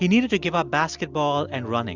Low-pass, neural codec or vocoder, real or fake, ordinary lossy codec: 7.2 kHz; none; real; Opus, 64 kbps